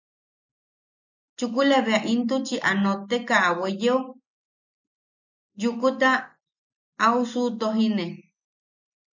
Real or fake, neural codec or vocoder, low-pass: real; none; 7.2 kHz